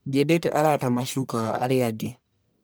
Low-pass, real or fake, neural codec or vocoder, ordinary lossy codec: none; fake; codec, 44.1 kHz, 1.7 kbps, Pupu-Codec; none